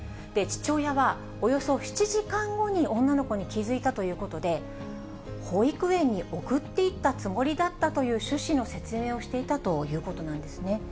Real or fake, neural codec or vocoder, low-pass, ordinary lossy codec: real; none; none; none